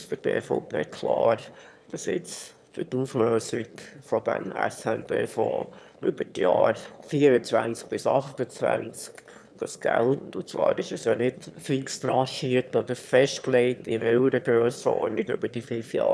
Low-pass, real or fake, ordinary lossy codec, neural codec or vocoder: none; fake; none; autoencoder, 22.05 kHz, a latent of 192 numbers a frame, VITS, trained on one speaker